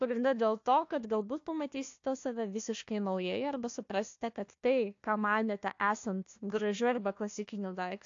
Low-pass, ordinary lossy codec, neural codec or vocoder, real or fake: 7.2 kHz; AAC, 48 kbps; codec, 16 kHz, 1 kbps, FunCodec, trained on Chinese and English, 50 frames a second; fake